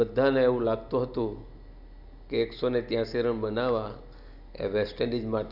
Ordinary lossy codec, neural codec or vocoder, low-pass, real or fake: none; none; 5.4 kHz; real